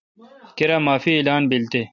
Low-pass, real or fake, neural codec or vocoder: 7.2 kHz; real; none